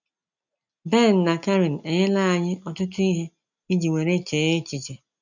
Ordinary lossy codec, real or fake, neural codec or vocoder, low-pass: none; real; none; 7.2 kHz